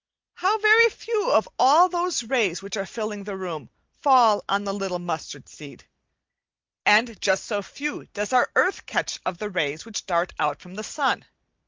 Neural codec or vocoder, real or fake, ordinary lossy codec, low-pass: none; real; Opus, 32 kbps; 7.2 kHz